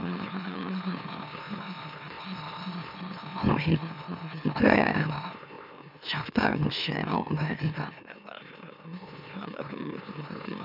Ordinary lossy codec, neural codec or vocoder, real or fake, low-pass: none; autoencoder, 44.1 kHz, a latent of 192 numbers a frame, MeloTTS; fake; 5.4 kHz